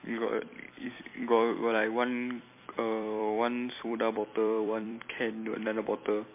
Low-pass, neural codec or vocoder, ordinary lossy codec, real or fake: 3.6 kHz; vocoder, 44.1 kHz, 128 mel bands every 256 samples, BigVGAN v2; MP3, 24 kbps; fake